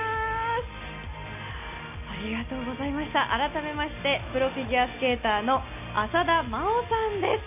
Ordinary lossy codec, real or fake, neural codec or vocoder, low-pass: none; real; none; 3.6 kHz